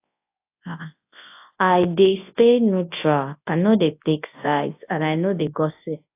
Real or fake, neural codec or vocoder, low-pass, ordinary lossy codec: fake; codec, 24 kHz, 0.9 kbps, WavTokenizer, large speech release; 3.6 kHz; AAC, 24 kbps